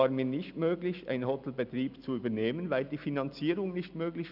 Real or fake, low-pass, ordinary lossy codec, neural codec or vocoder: real; 5.4 kHz; none; none